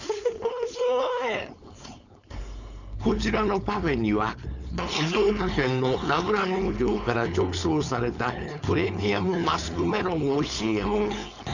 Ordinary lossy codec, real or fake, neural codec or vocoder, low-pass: none; fake; codec, 16 kHz, 4.8 kbps, FACodec; 7.2 kHz